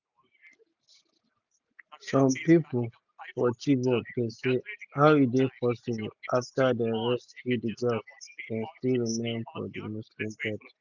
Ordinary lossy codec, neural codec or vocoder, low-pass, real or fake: none; none; 7.2 kHz; real